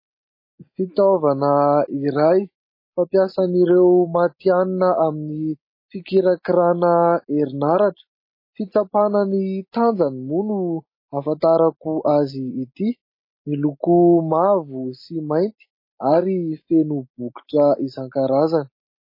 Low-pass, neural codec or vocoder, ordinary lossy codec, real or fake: 5.4 kHz; none; MP3, 24 kbps; real